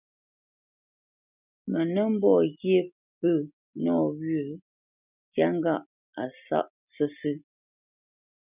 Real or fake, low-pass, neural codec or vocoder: real; 3.6 kHz; none